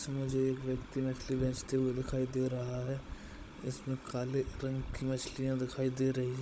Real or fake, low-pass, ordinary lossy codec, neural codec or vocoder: fake; none; none; codec, 16 kHz, 16 kbps, FunCodec, trained on Chinese and English, 50 frames a second